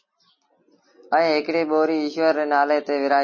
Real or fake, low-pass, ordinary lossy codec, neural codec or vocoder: real; 7.2 kHz; MP3, 32 kbps; none